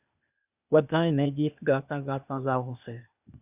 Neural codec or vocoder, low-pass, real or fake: codec, 16 kHz, 0.8 kbps, ZipCodec; 3.6 kHz; fake